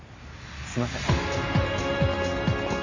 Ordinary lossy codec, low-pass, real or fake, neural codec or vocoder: AAC, 48 kbps; 7.2 kHz; real; none